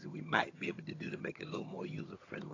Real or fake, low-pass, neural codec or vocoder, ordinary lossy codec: fake; 7.2 kHz; vocoder, 22.05 kHz, 80 mel bands, HiFi-GAN; AAC, 32 kbps